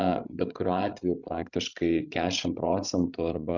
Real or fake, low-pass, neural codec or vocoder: fake; 7.2 kHz; codec, 16 kHz, 16 kbps, FreqCodec, larger model